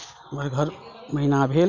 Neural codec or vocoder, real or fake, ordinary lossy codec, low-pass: none; real; none; 7.2 kHz